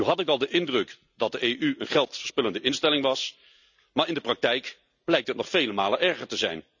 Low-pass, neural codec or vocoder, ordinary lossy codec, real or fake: 7.2 kHz; none; none; real